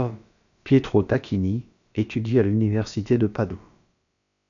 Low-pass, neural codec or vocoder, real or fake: 7.2 kHz; codec, 16 kHz, about 1 kbps, DyCAST, with the encoder's durations; fake